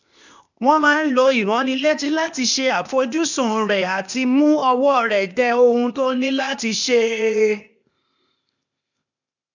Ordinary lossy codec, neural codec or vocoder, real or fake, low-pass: none; codec, 16 kHz, 0.8 kbps, ZipCodec; fake; 7.2 kHz